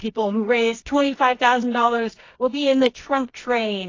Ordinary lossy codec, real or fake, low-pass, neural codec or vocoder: AAC, 32 kbps; fake; 7.2 kHz; codec, 24 kHz, 0.9 kbps, WavTokenizer, medium music audio release